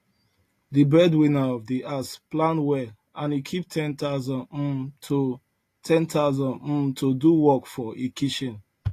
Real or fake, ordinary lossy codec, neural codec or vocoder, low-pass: real; AAC, 48 kbps; none; 14.4 kHz